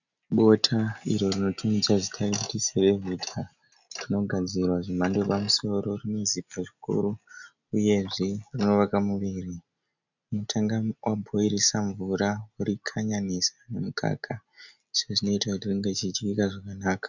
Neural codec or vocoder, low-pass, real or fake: none; 7.2 kHz; real